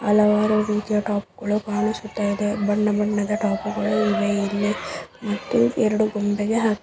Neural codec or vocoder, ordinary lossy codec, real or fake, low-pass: none; none; real; none